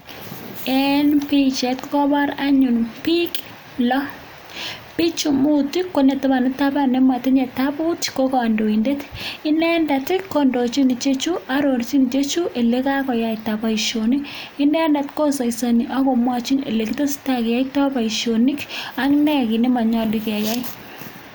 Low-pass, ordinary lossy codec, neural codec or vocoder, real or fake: none; none; none; real